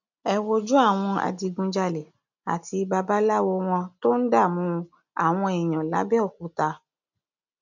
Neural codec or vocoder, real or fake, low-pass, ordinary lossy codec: none; real; 7.2 kHz; none